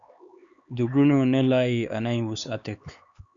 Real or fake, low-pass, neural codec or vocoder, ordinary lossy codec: fake; 7.2 kHz; codec, 16 kHz, 4 kbps, X-Codec, HuBERT features, trained on LibriSpeech; Opus, 64 kbps